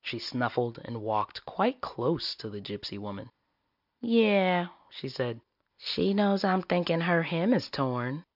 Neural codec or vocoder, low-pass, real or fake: none; 5.4 kHz; real